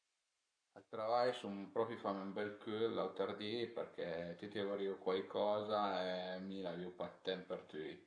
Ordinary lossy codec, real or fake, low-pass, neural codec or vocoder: MP3, 64 kbps; fake; 9.9 kHz; codec, 44.1 kHz, 7.8 kbps, Pupu-Codec